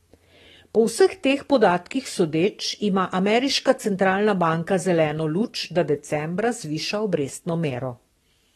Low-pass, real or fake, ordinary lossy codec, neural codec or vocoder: 19.8 kHz; fake; AAC, 32 kbps; codec, 44.1 kHz, 7.8 kbps, DAC